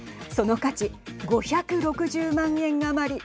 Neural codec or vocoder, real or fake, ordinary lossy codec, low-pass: none; real; none; none